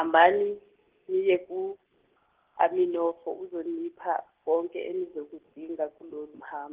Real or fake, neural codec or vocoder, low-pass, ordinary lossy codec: real; none; 3.6 kHz; Opus, 16 kbps